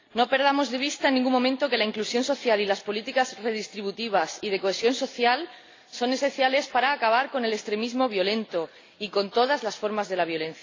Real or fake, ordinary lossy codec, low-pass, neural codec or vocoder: real; AAC, 32 kbps; 7.2 kHz; none